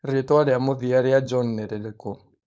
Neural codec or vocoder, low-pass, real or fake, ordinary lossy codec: codec, 16 kHz, 4.8 kbps, FACodec; none; fake; none